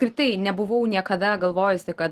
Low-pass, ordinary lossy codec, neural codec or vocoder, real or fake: 14.4 kHz; Opus, 16 kbps; none; real